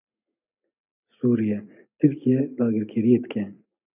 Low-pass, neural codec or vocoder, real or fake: 3.6 kHz; none; real